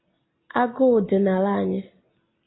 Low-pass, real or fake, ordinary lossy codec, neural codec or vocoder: 7.2 kHz; real; AAC, 16 kbps; none